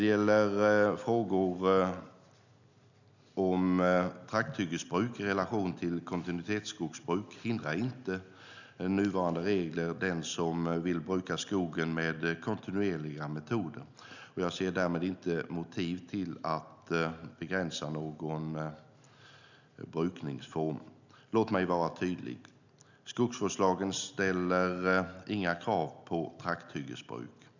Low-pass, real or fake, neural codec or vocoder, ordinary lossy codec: 7.2 kHz; real; none; none